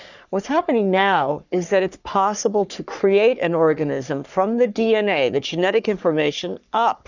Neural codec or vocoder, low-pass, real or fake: codec, 44.1 kHz, 3.4 kbps, Pupu-Codec; 7.2 kHz; fake